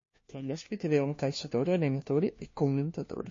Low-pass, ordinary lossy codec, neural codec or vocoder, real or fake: 7.2 kHz; MP3, 32 kbps; codec, 16 kHz, 1 kbps, FunCodec, trained on LibriTTS, 50 frames a second; fake